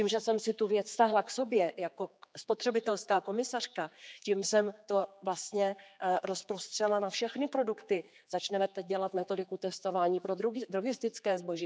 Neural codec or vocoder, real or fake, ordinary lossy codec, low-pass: codec, 16 kHz, 4 kbps, X-Codec, HuBERT features, trained on general audio; fake; none; none